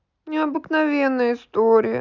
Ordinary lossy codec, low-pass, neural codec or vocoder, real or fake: none; 7.2 kHz; none; real